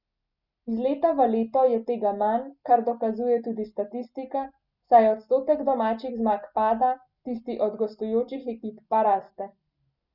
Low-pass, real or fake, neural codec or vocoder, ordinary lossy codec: 5.4 kHz; real; none; none